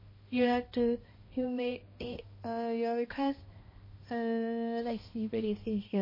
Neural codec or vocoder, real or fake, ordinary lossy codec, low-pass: codec, 16 kHz, 1 kbps, X-Codec, HuBERT features, trained on balanced general audio; fake; MP3, 24 kbps; 5.4 kHz